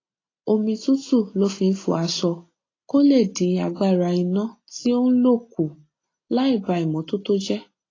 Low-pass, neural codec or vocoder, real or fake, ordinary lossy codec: 7.2 kHz; none; real; AAC, 32 kbps